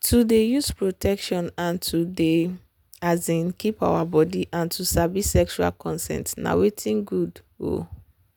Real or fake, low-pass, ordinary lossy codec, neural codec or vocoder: real; none; none; none